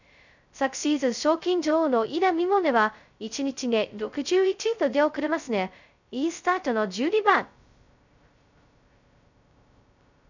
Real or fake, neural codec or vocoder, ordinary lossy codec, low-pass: fake; codec, 16 kHz, 0.2 kbps, FocalCodec; none; 7.2 kHz